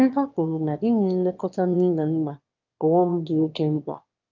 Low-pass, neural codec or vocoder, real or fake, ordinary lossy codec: 7.2 kHz; autoencoder, 22.05 kHz, a latent of 192 numbers a frame, VITS, trained on one speaker; fake; Opus, 24 kbps